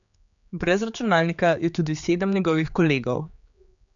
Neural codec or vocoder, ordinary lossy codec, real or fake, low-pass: codec, 16 kHz, 4 kbps, X-Codec, HuBERT features, trained on general audio; none; fake; 7.2 kHz